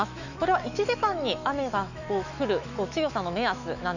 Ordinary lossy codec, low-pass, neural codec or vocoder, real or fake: none; 7.2 kHz; autoencoder, 48 kHz, 128 numbers a frame, DAC-VAE, trained on Japanese speech; fake